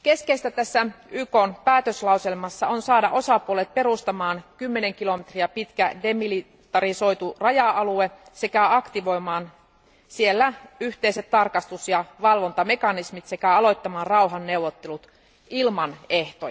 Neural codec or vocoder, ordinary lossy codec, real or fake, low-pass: none; none; real; none